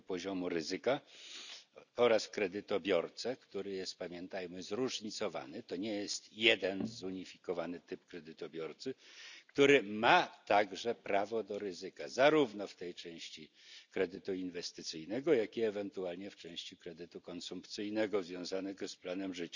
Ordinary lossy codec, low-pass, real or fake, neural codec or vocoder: none; 7.2 kHz; real; none